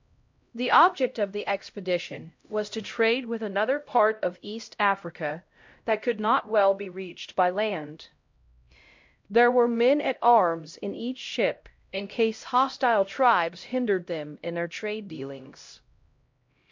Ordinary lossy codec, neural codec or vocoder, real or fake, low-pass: MP3, 48 kbps; codec, 16 kHz, 0.5 kbps, X-Codec, HuBERT features, trained on LibriSpeech; fake; 7.2 kHz